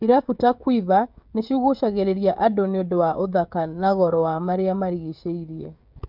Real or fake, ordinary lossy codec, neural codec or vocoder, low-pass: fake; none; codec, 16 kHz, 16 kbps, FreqCodec, smaller model; 5.4 kHz